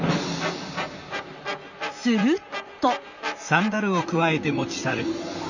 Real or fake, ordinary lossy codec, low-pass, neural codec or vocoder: fake; none; 7.2 kHz; vocoder, 44.1 kHz, 128 mel bands, Pupu-Vocoder